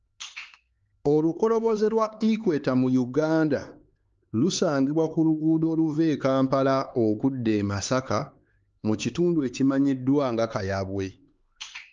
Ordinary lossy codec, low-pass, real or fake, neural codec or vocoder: Opus, 24 kbps; 7.2 kHz; fake; codec, 16 kHz, 4 kbps, X-Codec, HuBERT features, trained on LibriSpeech